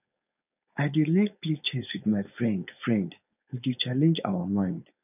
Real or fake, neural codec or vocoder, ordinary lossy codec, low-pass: fake; codec, 16 kHz, 4.8 kbps, FACodec; none; 3.6 kHz